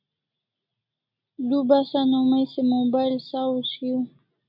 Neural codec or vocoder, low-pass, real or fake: none; 5.4 kHz; real